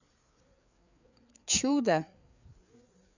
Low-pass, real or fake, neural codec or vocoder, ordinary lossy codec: 7.2 kHz; fake; codec, 16 kHz, 4 kbps, FreqCodec, larger model; none